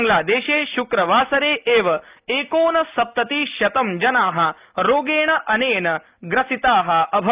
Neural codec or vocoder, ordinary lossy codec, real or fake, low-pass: none; Opus, 24 kbps; real; 3.6 kHz